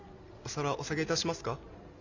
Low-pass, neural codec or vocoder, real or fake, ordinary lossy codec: 7.2 kHz; none; real; none